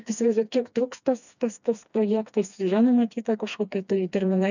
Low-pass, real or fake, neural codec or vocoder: 7.2 kHz; fake; codec, 16 kHz, 2 kbps, FreqCodec, smaller model